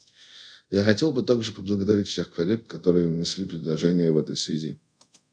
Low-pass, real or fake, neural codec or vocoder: 9.9 kHz; fake; codec, 24 kHz, 0.5 kbps, DualCodec